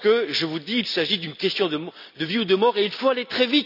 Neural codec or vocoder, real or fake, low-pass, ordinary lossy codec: none; real; 5.4 kHz; none